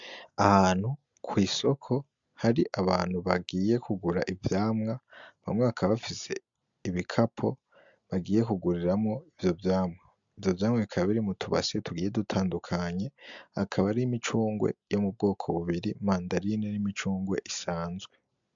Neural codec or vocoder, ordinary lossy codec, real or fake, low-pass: none; MP3, 64 kbps; real; 7.2 kHz